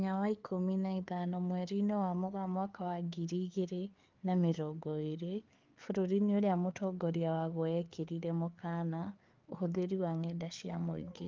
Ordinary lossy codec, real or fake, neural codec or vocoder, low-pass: Opus, 32 kbps; fake; codec, 16 kHz, 4 kbps, FreqCodec, larger model; 7.2 kHz